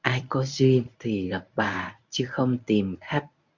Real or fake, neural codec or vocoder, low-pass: fake; codec, 24 kHz, 0.9 kbps, WavTokenizer, medium speech release version 1; 7.2 kHz